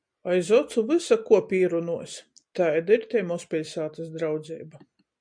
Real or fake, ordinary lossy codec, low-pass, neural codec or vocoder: real; MP3, 96 kbps; 9.9 kHz; none